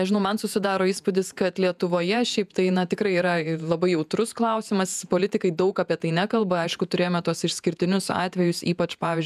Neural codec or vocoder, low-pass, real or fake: vocoder, 48 kHz, 128 mel bands, Vocos; 14.4 kHz; fake